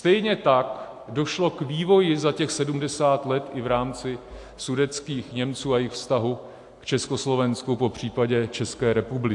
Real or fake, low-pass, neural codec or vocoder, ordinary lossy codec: real; 10.8 kHz; none; AAC, 64 kbps